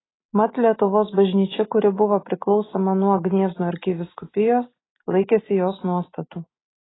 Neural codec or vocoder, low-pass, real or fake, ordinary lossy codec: none; 7.2 kHz; real; AAC, 16 kbps